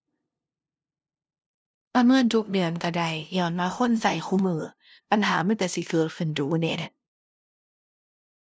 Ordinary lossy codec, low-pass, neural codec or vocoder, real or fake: none; none; codec, 16 kHz, 0.5 kbps, FunCodec, trained on LibriTTS, 25 frames a second; fake